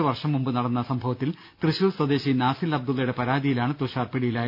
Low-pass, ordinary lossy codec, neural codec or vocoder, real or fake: 5.4 kHz; none; none; real